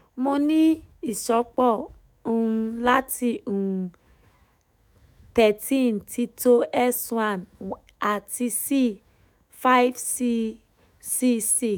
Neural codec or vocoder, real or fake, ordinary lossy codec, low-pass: autoencoder, 48 kHz, 128 numbers a frame, DAC-VAE, trained on Japanese speech; fake; none; none